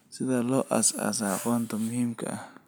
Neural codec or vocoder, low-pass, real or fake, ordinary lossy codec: none; none; real; none